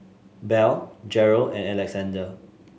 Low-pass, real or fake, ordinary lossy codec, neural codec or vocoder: none; real; none; none